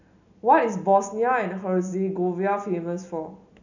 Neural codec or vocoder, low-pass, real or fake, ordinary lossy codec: none; 7.2 kHz; real; none